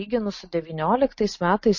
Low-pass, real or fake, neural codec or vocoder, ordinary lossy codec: 7.2 kHz; real; none; MP3, 32 kbps